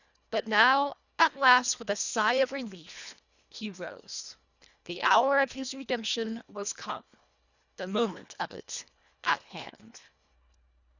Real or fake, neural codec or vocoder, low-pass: fake; codec, 24 kHz, 1.5 kbps, HILCodec; 7.2 kHz